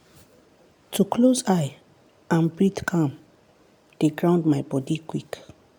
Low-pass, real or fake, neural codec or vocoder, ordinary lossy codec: none; real; none; none